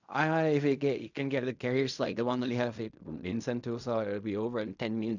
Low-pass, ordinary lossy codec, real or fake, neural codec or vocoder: 7.2 kHz; none; fake; codec, 16 kHz in and 24 kHz out, 0.4 kbps, LongCat-Audio-Codec, fine tuned four codebook decoder